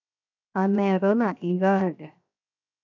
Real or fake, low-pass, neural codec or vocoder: fake; 7.2 kHz; codec, 16 kHz, 0.7 kbps, FocalCodec